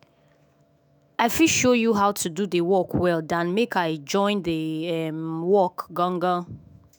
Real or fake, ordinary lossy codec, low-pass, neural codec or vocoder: fake; none; none; autoencoder, 48 kHz, 128 numbers a frame, DAC-VAE, trained on Japanese speech